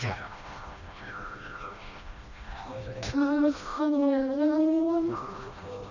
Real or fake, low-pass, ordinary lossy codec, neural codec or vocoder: fake; 7.2 kHz; none; codec, 16 kHz, 1 kbps, FreqCodec, smaller model